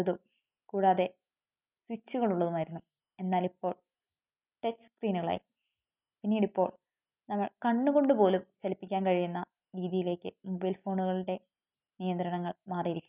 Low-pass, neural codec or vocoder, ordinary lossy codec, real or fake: 3.6 kHz; none; none; real